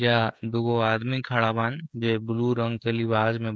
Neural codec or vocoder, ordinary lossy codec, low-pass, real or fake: codec, 16 kHz, 16 kbps, FreqCodec, smaller model; none; none; fake